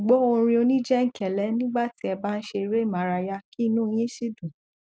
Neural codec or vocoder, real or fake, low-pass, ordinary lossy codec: none; real; none; none